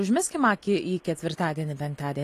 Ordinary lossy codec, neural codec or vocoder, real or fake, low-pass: AAC, 48 kbps; none; real; 14.4 kHz